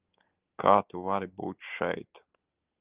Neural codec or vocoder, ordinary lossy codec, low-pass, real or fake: none; Opus, 24 kbps; 3.6 kHz; real